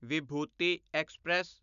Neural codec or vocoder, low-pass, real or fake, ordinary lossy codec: none; 7.2 kHz; real; none